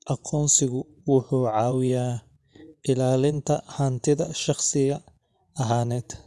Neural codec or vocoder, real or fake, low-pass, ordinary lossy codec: vocoder, 24 kHz, 100 mel bands, Vocos; fake; none; none